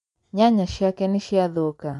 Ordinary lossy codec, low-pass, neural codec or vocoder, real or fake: none; 10.8 kHz; vocoder, 24 kHz, 100 mel bands, Vocos; fake